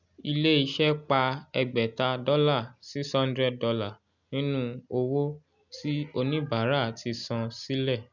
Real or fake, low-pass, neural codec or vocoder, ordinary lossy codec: real; 7.2 kHz; none; none